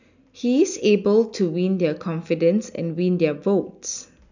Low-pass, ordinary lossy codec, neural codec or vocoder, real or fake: 7.2 kHz; none; none; real